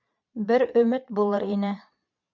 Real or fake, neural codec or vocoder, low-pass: fake; vocoder, 22.05 kHz, 80 mel bands, Vocos; 7.2 kHz